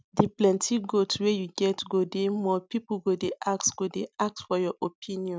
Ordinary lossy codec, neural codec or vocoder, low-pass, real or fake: none; none; none; real